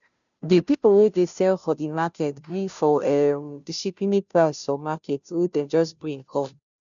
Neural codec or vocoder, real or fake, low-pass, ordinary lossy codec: codec, 16 kHz, 0.5 kbps, FunCodec, trained on Chinese and English, 25 frames a second; fake; 7.2 kHz; none